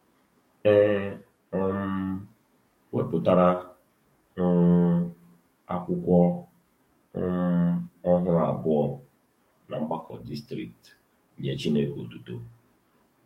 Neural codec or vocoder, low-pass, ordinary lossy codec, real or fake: codec, 44.1 kHz, 7.8 kbps, DAC; 19.8 kHz; MP3, 64 kbps; fake